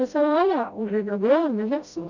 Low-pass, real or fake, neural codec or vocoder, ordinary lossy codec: 7.2 kHz; fake; codec, 16 kHz, 0.5 kbps, FreqCodec, smaller model; none